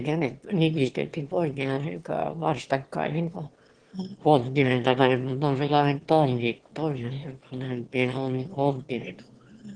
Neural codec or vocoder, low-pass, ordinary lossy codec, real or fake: autoencoder, 22.05 kHz, a latent of 192 numbers a frame, VITS, trained on one speaker; 9.9 kHz; Opus, 16 kbps; fake